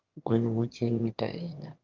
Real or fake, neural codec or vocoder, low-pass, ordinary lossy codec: fake; autoencoder, 22.05 kHz, a latent of 192 numbers a frame, VITS, trained on one speaker; 7.2 kHz; Opus, 32 kbps